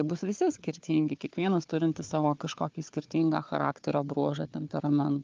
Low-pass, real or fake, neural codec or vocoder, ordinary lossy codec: 7.2 kHz; fake; codec, 16 kHz, 4 kbps, FunCodec, trained on Chinese and English, 50 frames a second; Opus, 32 kbps